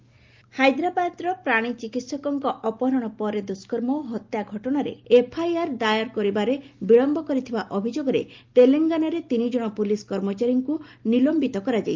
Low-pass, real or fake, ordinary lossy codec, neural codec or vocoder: 7.2 kHz; real; Opus, 32 kbps; none